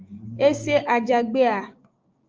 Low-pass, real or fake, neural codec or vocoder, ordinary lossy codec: 7.2 kHz; fake; codec, 16 kHz, 6 kbps, DAC; Opus, 24 kbps